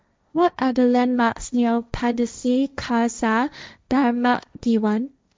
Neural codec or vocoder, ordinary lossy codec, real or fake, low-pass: codec, 16 kHz, 1.1 kbps, Voila-Tokenizer; none; fake; none